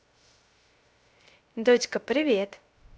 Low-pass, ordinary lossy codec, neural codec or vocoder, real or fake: none; none; codec, 16 kHz, 0.3 kbps, FocalCodec; fake